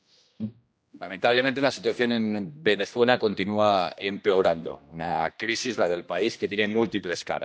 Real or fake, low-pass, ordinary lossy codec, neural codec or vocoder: fake; none; none; codec, 16 kHz, 1 kbps, X-Codec, HuBERT features, trained on general audio